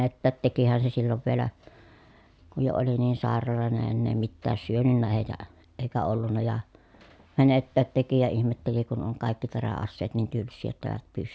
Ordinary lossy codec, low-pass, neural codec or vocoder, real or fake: none; none; none; real